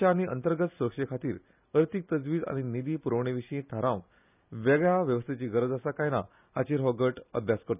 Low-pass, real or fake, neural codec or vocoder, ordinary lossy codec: 3.6 kHz; real; none; none